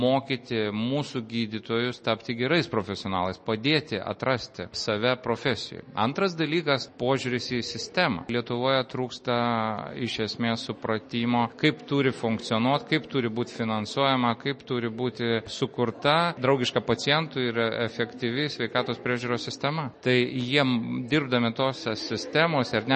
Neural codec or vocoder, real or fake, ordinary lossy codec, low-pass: none; real; MP3, 32 kbps; 10.8 kHz